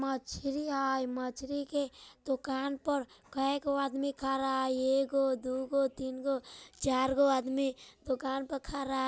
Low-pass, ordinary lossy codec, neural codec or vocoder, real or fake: none; none; none; real